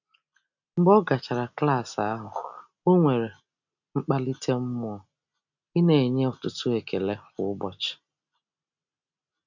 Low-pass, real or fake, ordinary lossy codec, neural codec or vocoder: 7.2 kHz; real; none; none